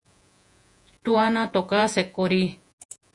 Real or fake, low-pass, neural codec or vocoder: fake; 10.8 kHz; vocoder, 48 kHz, 128 mel bands, Vocos